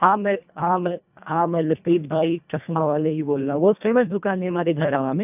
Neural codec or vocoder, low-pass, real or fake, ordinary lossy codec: codec, 24 kHz, 1.5 kbps, HILCodec; 3.6 kHz; fake; none